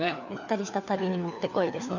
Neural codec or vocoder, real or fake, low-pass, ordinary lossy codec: codec, 16 kHz, 4 kbps, FunCodec, trained on LibriTTS, 50 frames a second; fake; 7.2 kHz; none